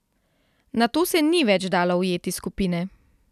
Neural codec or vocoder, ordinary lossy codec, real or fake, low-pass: none; none; real; 14.4 kHz